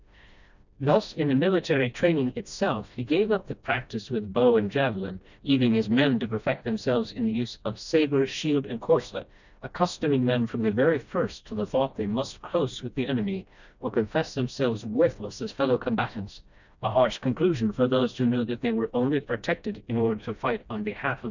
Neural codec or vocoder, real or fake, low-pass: codec, 16 kHz, 1 kbps, FreqCodec, smaller model; fake; 7.2 kHz